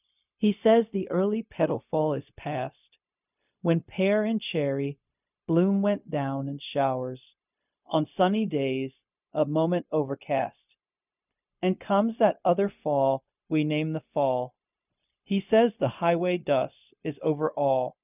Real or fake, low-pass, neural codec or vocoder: fake; 3.6 kHz; codec, 16 kHz, 0.4 kbps, LongCat-Audio-Codec